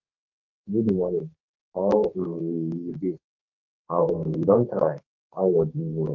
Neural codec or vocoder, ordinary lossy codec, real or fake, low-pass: codec, 32 kHz, 1.9 kbps, SNAC; Opus, 32 kbps; fake; 7.2 kHz